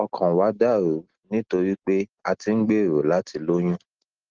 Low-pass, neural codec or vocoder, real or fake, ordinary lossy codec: 7.2 kHz; none; real; Opus, 16 kbps